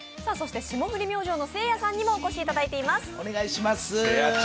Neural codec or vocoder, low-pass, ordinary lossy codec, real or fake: none; none; none; real